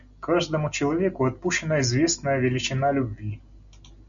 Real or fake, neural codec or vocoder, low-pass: real; none; 7.2 kHz